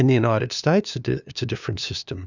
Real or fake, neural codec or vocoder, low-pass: fake; codec, 16 kHz, 2 kbps, FunCodec, trained on LibriTTS, 25 frames a second; 7.2 kHz